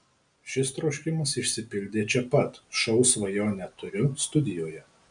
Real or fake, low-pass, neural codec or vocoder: real; 9.9 kHz; none